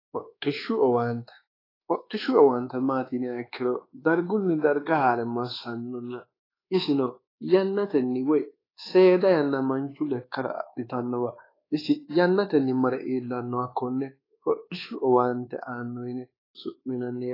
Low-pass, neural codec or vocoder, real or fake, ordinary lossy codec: 5.4 kHz; codec, 24 kHz, 1.2 kbps, DualCodec; fake; AAC, 24 kbps